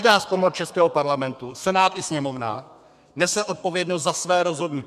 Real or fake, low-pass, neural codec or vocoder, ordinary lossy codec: fake; 14.4 kHz; codec, 32 kHz, 1.9 kbps, SNAC; MP3, 96 kbps